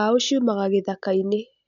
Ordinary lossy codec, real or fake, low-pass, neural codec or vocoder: none; real; 7.2 kHz; none